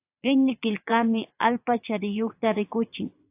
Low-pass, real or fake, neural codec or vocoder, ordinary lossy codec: 3.6 kHz; fake; vocoder, 22.05 kHz, 80 mel bands, Vocos; AAC, 32 kbps